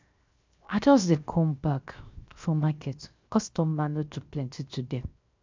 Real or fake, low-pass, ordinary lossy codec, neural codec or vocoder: fake; 7.2 kHz; MP3, 64 kbps; codec, 16 kHz, 0.7 kbps, FocalCodec